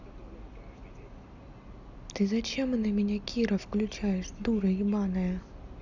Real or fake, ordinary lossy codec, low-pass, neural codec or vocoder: real; Opus, 64 kbps; 7.2 kHz; none